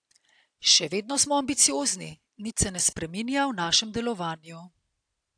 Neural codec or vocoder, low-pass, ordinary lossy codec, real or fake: none; 9.9 kHz; AAC, 64 kbps; real